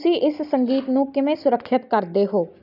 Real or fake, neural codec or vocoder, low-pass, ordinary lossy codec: real; none; 5.4 kHz; none